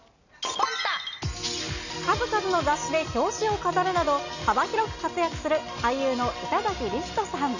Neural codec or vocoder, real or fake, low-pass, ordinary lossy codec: none; real; 7.2 kHz; none